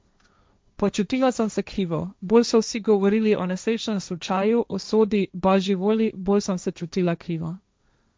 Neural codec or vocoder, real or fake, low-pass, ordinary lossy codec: codec, 16 kHz, 1.1 kbps, Voila-Tokenizer; fake; none; none